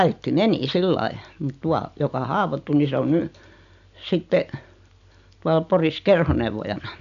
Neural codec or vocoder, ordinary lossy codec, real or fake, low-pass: none; none; real; 7.2 kHz